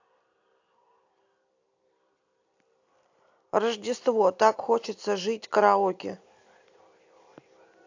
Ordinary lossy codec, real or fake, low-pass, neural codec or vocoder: AAC, 48 kbps; real; 7.2 kHz; none